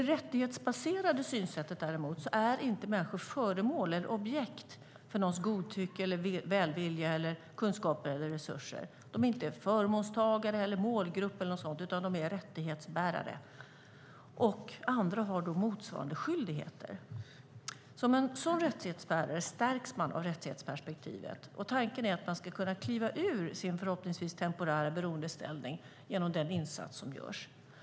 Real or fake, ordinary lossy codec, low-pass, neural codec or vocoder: real; none; none; none